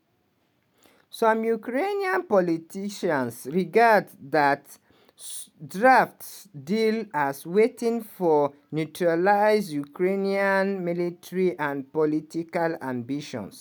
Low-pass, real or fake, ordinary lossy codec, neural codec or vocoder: none; real; none; none